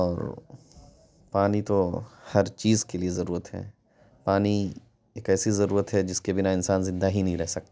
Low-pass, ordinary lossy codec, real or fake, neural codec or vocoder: none; none; real; none